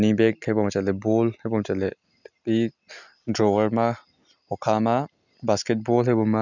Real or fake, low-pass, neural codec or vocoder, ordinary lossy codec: real; 7.2 kHz; none; none